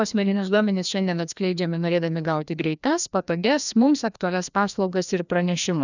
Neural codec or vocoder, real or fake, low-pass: codec, 16 kHz, 1 kbps, FreqCodec, larger model; fake; 7.2 kHz